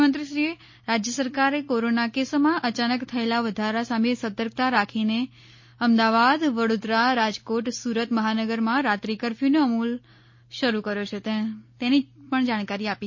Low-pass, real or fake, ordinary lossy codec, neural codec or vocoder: 7.2 kHz; real; MP3, 32 kbps; none